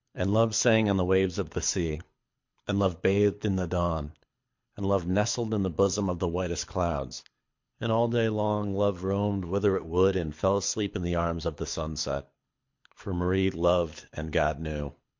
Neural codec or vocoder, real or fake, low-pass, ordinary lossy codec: codec, 24 kHz, 6 kbps, HILCodec; fake; 7.2 kHz; MP3, 48 kbps